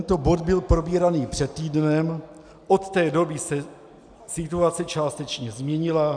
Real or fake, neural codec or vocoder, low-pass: real; none; 9.9 kHz